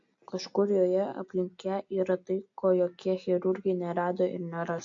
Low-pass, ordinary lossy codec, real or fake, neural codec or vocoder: 7.2 kHz; AAC, 48 kbps; real; none